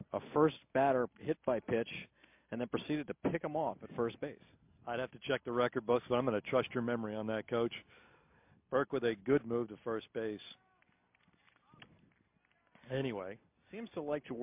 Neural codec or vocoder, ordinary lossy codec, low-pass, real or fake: none; MP3, 32 kbps; 3.6 kHz; real